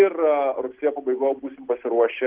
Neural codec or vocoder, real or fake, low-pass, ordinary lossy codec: none; real; 3.6 kHz; Opus, 16 kbps